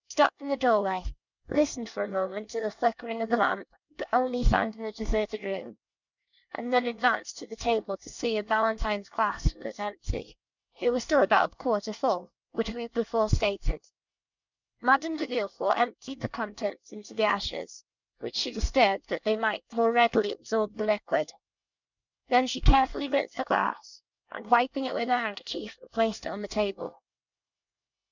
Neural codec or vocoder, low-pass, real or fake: codec, 24 kHz, 1 kbps, SNAC; 7.2 kHz; fake